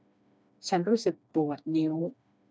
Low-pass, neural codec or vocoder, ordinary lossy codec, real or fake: none; codec, 16 kHz, 2 kbps, FreqCodec, smaller model; none; fake